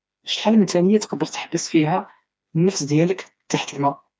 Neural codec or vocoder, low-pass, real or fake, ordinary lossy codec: codec, 16 kHz, 2 kbps, FreqCodec, smaller model; none; fake; none